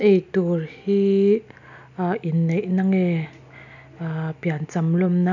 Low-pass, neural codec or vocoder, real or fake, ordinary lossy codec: 7.2 kHz; none; real; none